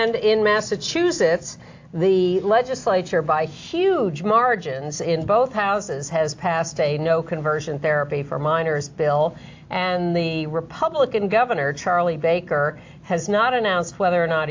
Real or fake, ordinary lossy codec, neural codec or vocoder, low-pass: real; AAC, 48 kbps; none; 7.2 kHz